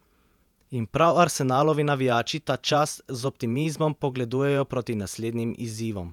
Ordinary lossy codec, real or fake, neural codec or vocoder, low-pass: none; fake; vocoder, 48 kHz, 128 mel bands, Vocos; 19.8 kHz